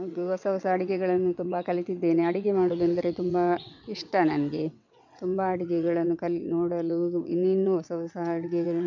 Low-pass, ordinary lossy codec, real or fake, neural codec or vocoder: 7.2 kHz; none; fake; vocoder, 22.05 kHz, 80 mel bands, WaveNeXt